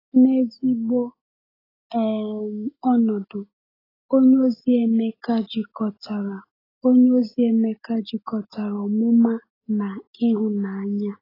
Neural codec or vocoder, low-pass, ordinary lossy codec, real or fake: none; 5.4 kHz; AAC, 24 kbps; real